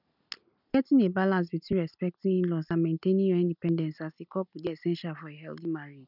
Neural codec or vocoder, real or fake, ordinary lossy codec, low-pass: none; real; none; 5.4 kHz